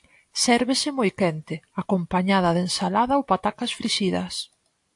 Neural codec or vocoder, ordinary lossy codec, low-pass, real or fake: none; AAC, 64 kbps; 10.8 kHz; real